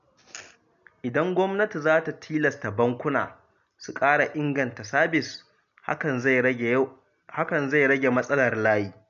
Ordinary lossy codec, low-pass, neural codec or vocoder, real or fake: MP3, 96 kbps; 7.2 kHz; none; real